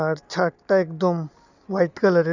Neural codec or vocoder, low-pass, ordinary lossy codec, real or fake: none; 7.2 kHz; none; real